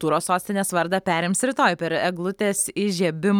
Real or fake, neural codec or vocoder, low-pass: real; none; 19.8 kHz